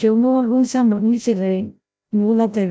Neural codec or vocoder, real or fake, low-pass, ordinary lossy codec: codec, 16 kHz, 0.5 kbps, FreqCodec, larger model; fake; none; none